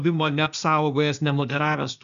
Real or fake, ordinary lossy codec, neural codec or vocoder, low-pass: fake; MP3, 96 kbps; codec, 16 kHz, 0.8 kbps, ZipCodec; 7.2 kHz